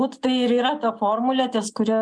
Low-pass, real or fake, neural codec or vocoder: 9.9 kHz; real; none